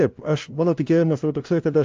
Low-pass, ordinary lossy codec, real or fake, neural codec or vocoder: 7.2 kHz; Opus, 24 kbps; fake; codec, 16 kHz, 0.5 kbps, FunCodec, trained on LibriTTS, 25 frames a second